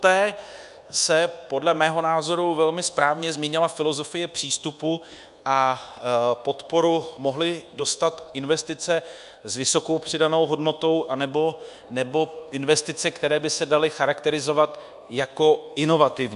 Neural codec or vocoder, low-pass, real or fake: codec, 24 kHz, 1.2 kbps, DualCodec; 10.8 kHz; fake